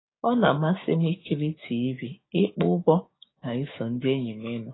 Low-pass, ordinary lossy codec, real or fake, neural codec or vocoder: 7.2 kHz; AAC, 16 kbps; fake; codec, 16 kHz, 6 kbps, DAC